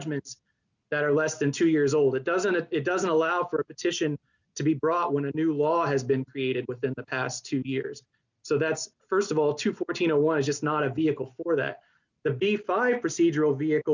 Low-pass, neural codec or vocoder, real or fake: 7.2 kHz; none; real